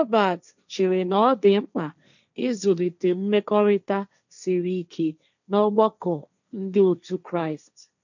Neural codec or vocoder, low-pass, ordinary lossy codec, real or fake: codec, 16 kHz, 1.1 kbps, Voila-Tokenizer; 7.2 kHz; none; fake